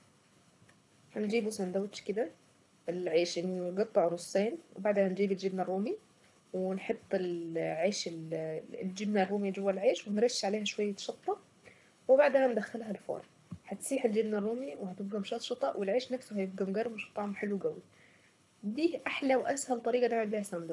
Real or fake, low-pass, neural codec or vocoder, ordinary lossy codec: fake; none; codec, 24 kHz, 6 kbps, HILCodec; none